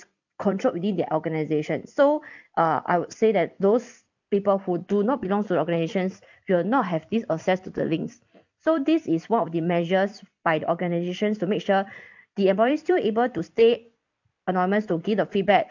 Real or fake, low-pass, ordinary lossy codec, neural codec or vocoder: fake; 7.2 kHz; none; vocoder, 44.1 kHz, 128 mel bands every 256 samples, BigVGAN v2